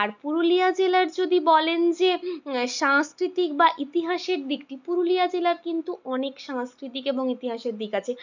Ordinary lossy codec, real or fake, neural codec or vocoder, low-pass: none; real; none; 7.2 kHz